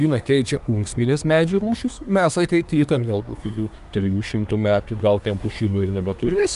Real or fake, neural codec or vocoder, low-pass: fake; codec, 24 kHz, 1 kbps, SNAC; 10.8 kHz